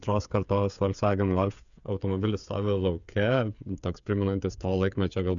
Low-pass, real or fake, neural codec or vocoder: 7.2 kHz; fake; codec, 16 kHz, 8 kbps, FreqCodec, smaller model